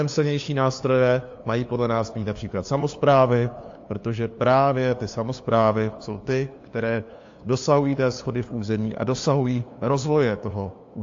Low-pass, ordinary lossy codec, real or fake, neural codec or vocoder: 7.2 kHz; AAC, 48 kbps; fake; codec, 16 kHz, 2 kbps, FunCodec, trained on LibriTTS, 25 frames a second